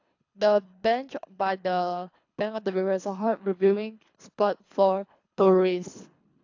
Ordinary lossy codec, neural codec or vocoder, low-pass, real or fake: AAC, 48 kbps; codec, 24 kHz, 3 kbps, HILCodec; 7.2 kHz; fake